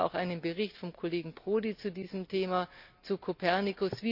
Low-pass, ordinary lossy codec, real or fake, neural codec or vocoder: 5.4 kHz; none; real; none